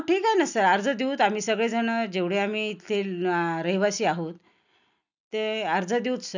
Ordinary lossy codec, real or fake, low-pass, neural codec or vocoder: none; real; 7.2 kHz; none